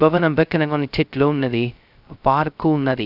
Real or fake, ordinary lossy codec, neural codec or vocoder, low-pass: fake; none; codec, 16 kHz, 0.2 kbps, FocalCodec; 5.4 kHz